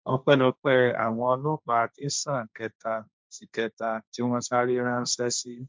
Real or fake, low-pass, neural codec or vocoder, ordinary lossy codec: fake; none; codec, 16 kHz, 1.1 kbps, Voila-Tokenizer; none